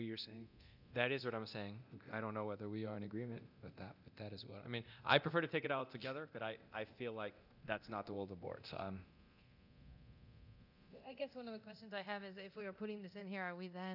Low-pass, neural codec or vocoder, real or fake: 5.4 kHz; codec, 24 kHz, 0.9 kbps, DualCodec; fake